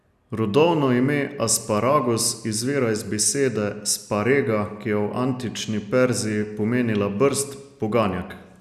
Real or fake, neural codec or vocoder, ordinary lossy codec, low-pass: real; none; none; 14.4 kHz